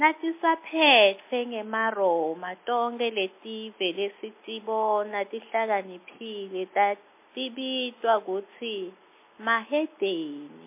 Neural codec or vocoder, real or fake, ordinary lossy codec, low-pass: none; real; MP3, 24 kbps; 3.6 kHz